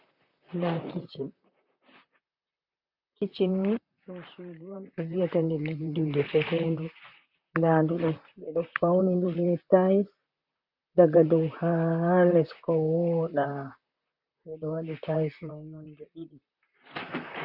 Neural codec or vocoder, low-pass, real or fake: vocoder, 44.1 kHz, 128 mel bands, Pupu-Vocoder; 5.4 kHz; fake